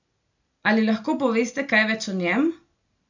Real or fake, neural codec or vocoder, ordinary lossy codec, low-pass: real; none; none; 7.2 kHz